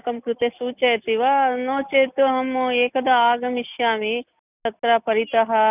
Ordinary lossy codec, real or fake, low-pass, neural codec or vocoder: none; real; 3.6 kHz; none